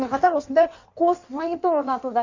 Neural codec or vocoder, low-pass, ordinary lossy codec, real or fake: codec, 16 kHz in and 24 kHz out, 1.1 kbps, FireRedTTS-2 codec; 7.2 kHz; Opus, 64 kbps; fake